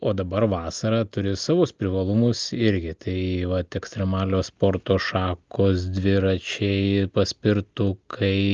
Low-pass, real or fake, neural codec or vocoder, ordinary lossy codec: 7.2 kHz; real; none; Opus, 32 kbps